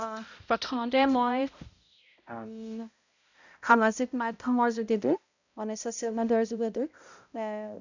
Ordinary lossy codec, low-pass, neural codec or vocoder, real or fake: none; 7.2 kHz; codec, 16 kHz, 0.5 kbps, X-Codec, HuBERT features, trained on balanced general audio; fake